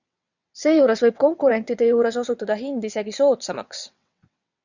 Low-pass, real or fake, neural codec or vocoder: 7.2 kHz; fake; vocoder, 22.05 kHz, 80 mel bands, WaveNeXt